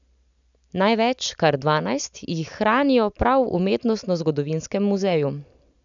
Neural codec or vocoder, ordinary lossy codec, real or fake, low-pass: none; none; real; 7.2 kHz